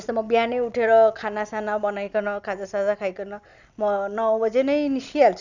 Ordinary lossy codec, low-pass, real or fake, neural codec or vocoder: none; 7.2 kHz; real; none